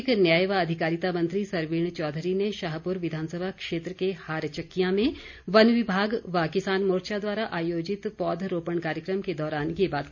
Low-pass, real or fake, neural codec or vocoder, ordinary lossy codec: 7.2 kHz; real; none; none